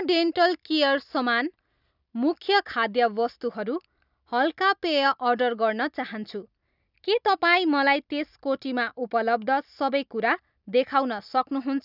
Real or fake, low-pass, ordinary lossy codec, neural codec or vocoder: real; 5.4 kHz; none; none